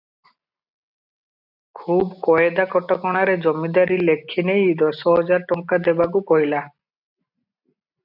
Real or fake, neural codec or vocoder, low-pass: real; none; 5.4 kHz